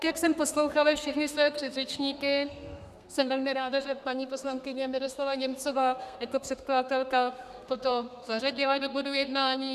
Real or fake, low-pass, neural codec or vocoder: fake; 14.4 kHz; codec, 32 kHz, 1.9 kbps, SNAC